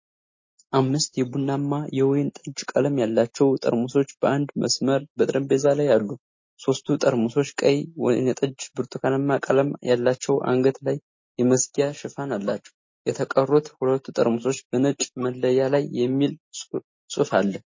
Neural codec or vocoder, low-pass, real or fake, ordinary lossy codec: none; 7.2 kHz; real; MP3, 32 kbps